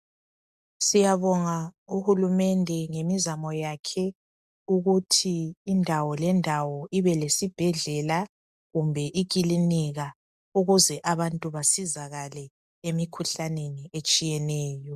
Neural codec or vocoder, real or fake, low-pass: none; real; 14.4 kHz